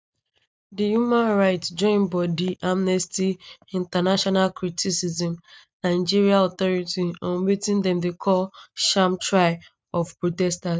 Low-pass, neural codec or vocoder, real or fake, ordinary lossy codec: none; none; real; none